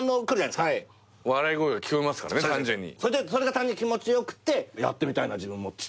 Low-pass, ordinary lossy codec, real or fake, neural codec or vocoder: none; none; real; none